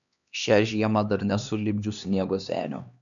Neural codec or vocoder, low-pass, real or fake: codec, 16 kHz, 2 kbps, X-Codec, HuBERT features, trained on LibriSpeech; 7.2 kHz; fake